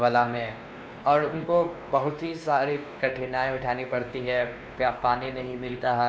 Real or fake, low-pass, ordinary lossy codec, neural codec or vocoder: fake; none; none; codec, 16 kHz, 2 kbps, X-Codec, WavLM features, trained on Multilingual LibriSpeech